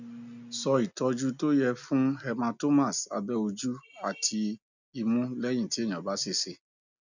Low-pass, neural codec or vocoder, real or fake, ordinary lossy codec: 7.2 kHz; none; real; none